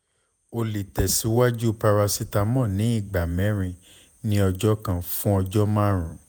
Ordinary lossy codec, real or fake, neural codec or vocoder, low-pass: none; fake; vocoder, 48 kHz, 128 mel bands, Vocos; none